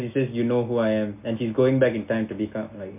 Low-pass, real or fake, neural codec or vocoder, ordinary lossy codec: 3.6 kHz; real; none; MP3, 32 kbps